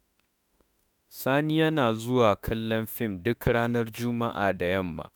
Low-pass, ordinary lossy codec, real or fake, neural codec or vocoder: none; none; fake; autoencoder, 48 kHz, 32 numbers a frame, DAC-VAE, trained on Japanese speech